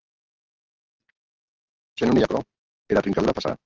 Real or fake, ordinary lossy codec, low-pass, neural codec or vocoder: real; Opus, 16 kbps; 7.2 kHz; none